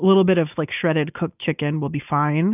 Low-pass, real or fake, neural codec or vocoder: 3.6 kHz; real; none